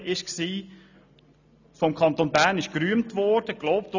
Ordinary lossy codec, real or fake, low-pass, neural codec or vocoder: none; real; 7.2 kHz; none